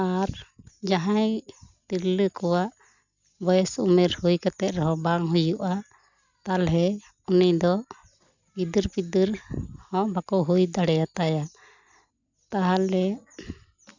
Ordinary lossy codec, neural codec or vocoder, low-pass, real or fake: none; none; 7.2 kHz; real